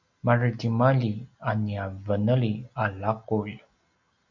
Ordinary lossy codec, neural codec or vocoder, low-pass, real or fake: Opus, 64 kbps; none; 7.2 kHz; real